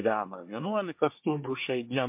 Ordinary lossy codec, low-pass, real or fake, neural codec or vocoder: MP3, 32 kbps; 3.6 kHz; fake; codec, 24 kHz, 1 kbps, SNAC